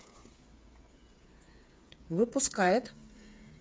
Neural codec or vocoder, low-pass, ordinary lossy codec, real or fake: codec, 16 kHz, 8 kbps, FreqCodec, smaller model; none; none; fake